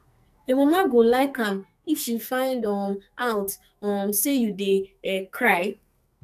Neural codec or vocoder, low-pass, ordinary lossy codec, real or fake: codec, 44.1 kHz, 2.6 kbps, SNAC; 14.4 kHz; none; fake